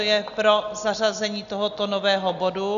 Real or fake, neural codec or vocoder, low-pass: real; none; 7.2 kHz